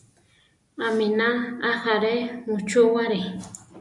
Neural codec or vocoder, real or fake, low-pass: none; real; 10.8 kHz